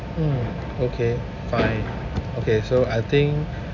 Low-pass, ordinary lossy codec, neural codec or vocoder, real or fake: 7.2 kHz; AAC, 48 kbps; none; real